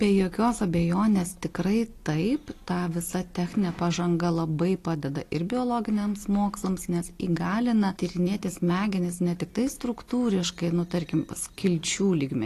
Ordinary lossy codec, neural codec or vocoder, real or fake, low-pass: AAC, 48 kbps; none; real; 14.4 kHz